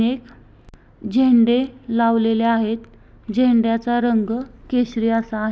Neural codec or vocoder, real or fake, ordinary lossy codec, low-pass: none; real; none; none